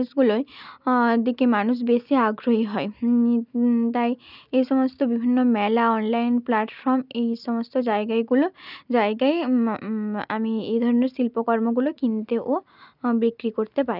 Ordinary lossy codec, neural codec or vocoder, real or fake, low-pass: none; none; real; 5.4 kHz